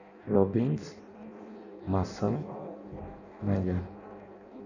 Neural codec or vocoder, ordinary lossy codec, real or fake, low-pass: codec, 16 kHz in and 24 kHz out, 0.6 kbps, FireRedTTS-2 codec; none; fake; 7.2 kHz